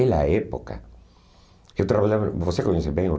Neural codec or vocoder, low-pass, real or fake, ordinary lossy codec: none; none; real; none